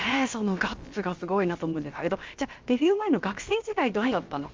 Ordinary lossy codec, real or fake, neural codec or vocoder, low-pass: Opus, 32 kbps; fake; codec, 16 kHz, about 1 kbps, DyCAST, with the encoder's durations; 7.2 kHz